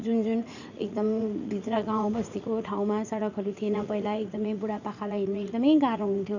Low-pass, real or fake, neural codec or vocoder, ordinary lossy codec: 7.2 kHz; fake; vocoder, 44.1 kHz, 80 mel bands, Vocos; none